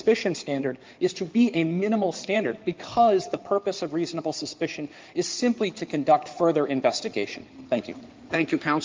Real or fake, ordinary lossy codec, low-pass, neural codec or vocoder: fake; Opus, 32 kbps; 7.2 kHz; codec, 16 kHz in and 24 kHz out, 2.2 kbps, FireRedTTS-2 codec